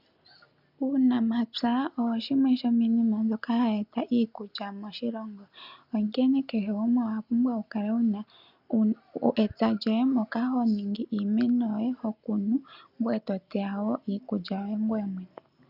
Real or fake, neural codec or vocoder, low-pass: real; none; 5.4 kHz